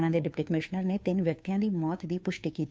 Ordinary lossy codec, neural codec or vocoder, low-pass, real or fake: none; codec, 16 kHz, 2 kbps, FunCodec, trained on Chinese and English, 25 frames a second; none; fake